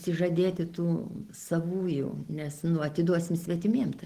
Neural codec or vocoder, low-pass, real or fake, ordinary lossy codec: none; 14.4 kHz; real; Opus, 16 kbps